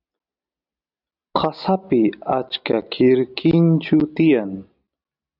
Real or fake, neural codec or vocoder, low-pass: real; none; 5.4 kHz